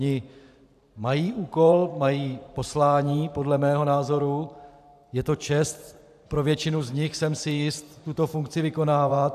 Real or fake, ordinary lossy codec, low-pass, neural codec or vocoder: fake; AAC, 96 kbps; 14.4 kHz; vocoder, 44.1 kHz, 128 mel bands every 512 samples, BigVGAN v2